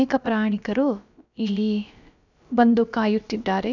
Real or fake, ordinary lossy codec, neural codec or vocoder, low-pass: fake; none; codec, 16 kHz, about 1 kbps, DyCAST, with the encoder's durations; 7.2 kHz